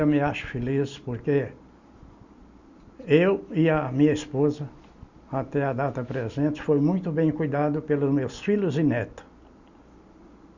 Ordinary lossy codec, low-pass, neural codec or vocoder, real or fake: Opus, 64 kbps; 7.2 kHz; none; real